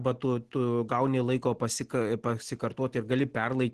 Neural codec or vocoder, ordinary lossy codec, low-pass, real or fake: none; Opus, 16 kbps; 10.8 kHz; real